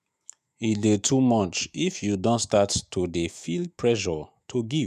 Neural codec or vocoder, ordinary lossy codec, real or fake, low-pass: autoencoder, 48 kHz, 128 numbers a frame, DAC-VAE, trained on Japanese speech; none; fake; 14.4 kHz